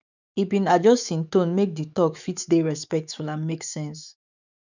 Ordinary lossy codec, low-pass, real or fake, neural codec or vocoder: none; 7.2 kHz; fake; autoencoder, 48 kHz, 128 numbers a frame, DAC-VAE, trained on Japanese speech